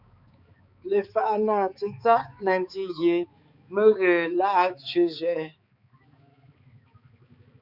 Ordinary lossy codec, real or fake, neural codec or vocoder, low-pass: Opus, 64 kbps; fake; codec, 16 kHz, 4 kbps, X-Codec, HuBERT features, trained on balanced general audio; 5.4 kHz